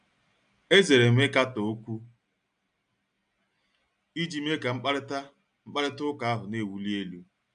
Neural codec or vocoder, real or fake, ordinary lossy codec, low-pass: none; real; AAC, 96 kbps; 9.9 kHz